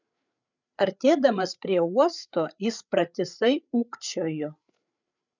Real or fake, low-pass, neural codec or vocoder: fake; 7.2 kHz; codec, 16 kHz, 8 kbps, FreqCodec, larger model